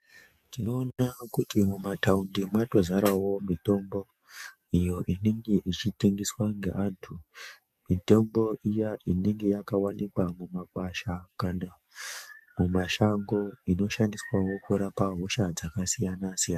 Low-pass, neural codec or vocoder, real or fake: 14.4 kHz; codec, 44.1 kHz, 7.8 kbps, DAC; fake